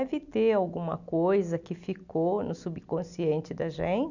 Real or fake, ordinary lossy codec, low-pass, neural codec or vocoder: real; none; 7.2 kHz; none